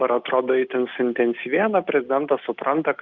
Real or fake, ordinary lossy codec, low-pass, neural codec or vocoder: real; Opus, 32 kbps; 7.2 kHz; none